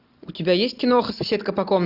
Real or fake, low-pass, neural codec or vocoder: real; 5.4 kHz; none